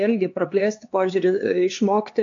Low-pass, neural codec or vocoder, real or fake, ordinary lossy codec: 7.2 kHz; codec, 16 kHz, 2 kbps, X-Codec, HuBERT features, trained on LibriSpeech; fake; AAC, 48 kbps